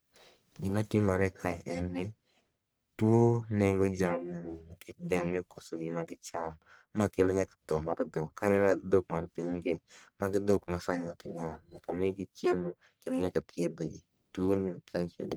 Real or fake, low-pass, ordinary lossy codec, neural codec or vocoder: fake; none; none; codec, 44.1 kHz, 1.7 kbps, Pupu-Codec